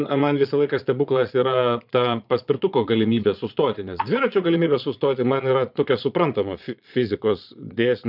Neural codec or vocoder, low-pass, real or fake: vocoder, 22.05 kHz, 80 mel bands, Vocos; 5.4 kHz; fake